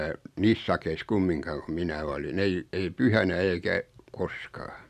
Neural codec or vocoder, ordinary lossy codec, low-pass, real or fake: none; none; 14.4 kHz; real